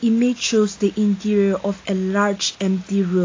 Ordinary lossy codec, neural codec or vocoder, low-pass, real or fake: AAC, 32 kbps; none; 7.2 kHz; real